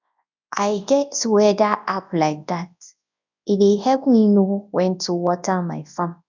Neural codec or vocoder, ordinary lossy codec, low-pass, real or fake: codec, 24 kHz, 0.9 kbps, WavTokenizer, large speech release; none; 7.2 kHz; fake